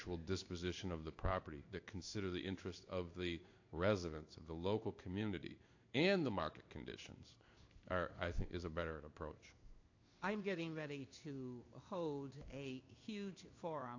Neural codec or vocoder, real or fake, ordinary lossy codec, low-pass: codec, 16 kHz in and 24 kHz out, 1 kbps, XY-Tokenizer; fake; AAC, 48 kbps; 7.2 kHz